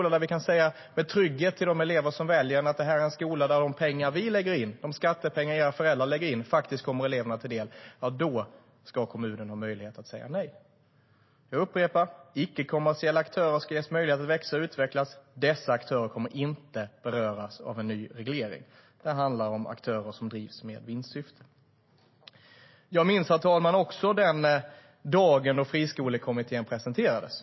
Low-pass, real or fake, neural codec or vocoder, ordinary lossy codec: 7.2 kHz; real; none; MP3, 24 kbps